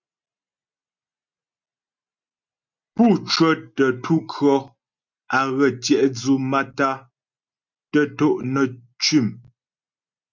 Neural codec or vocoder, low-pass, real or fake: none; 7.2 kHz; real